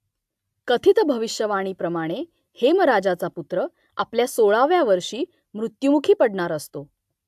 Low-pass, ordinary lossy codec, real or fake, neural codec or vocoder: 14.4 kHz; AAC, 96 kbps; real; none